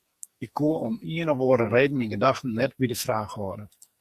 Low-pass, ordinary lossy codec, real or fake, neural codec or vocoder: 14.4 kHz; Opus, 64 kbps; fake; codec, 44.1 kHz, 2.6 kbps, SNAC